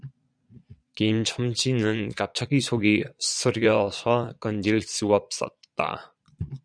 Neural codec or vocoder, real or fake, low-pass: vocoder, 22.05 kHz, 80 mel bands, Vocos; fake; 9.9 kHz